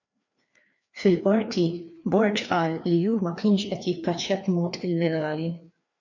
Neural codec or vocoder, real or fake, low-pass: codec, 16 kHz, 2 kbps, FreqCodec, larger model; fake; 7.2 kHz